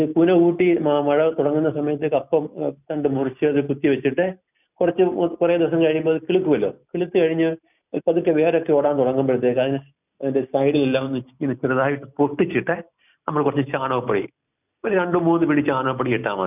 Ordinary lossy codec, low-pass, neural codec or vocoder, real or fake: none; 3.6 kHz; none; real